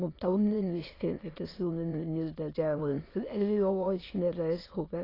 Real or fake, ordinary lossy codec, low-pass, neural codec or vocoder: fake; AAC, 24 kbps; 5.4 kHz; autoencoder, 22.05 kHz, a latent of 192 numbers a frame, VITS, trained on many speakers